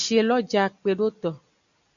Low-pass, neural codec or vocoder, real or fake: 7.2 kHz; none; real